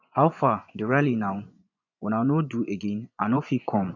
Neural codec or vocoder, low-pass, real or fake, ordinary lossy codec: vocoder, 24 kHz, 100 mel bands, Vocos; 7.2 kHz; fake; none